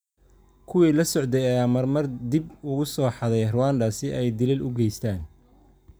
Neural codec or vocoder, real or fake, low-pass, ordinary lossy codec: none; real; none; none